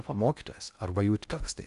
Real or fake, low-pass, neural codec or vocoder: fake; 10.8 kHz; codec, 16 kHz in and 24 kHz out, 0.6 kbps, FocalCodec, streaming, 2048 codes